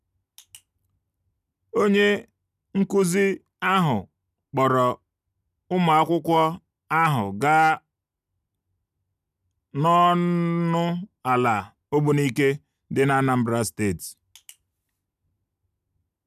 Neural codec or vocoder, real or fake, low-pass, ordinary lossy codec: none; real; 14.4 kHz; none